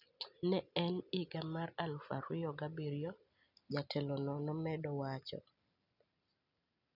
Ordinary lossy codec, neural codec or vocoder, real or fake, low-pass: none; none; real; 5.4 kHz